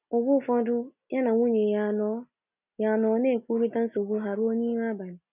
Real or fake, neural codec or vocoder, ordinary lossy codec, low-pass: real; none; none; 3.6 kHz